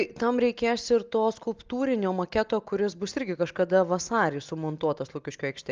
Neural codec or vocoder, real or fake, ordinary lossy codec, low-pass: none; real; Opus, 24 kbps; 7.2 kHz